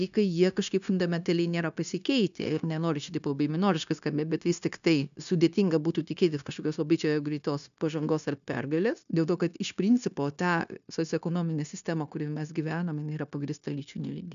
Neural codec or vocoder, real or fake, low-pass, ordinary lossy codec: codec, 16 kHz, 0.9 kbps, LongCat-Audio-Codec; fake; 7.2 kHz; MP3, 96 kbps